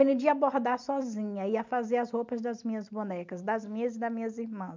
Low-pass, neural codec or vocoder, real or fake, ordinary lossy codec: 7.2 kHz; none; real; MP3, 64 kbps